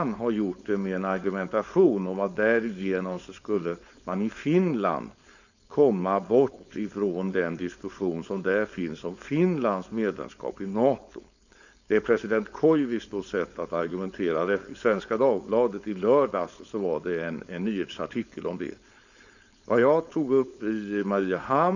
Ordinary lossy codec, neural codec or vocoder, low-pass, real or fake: none; codec, 16 kHz, 4.8 kbps, FACodec; 7.2 kHz; fake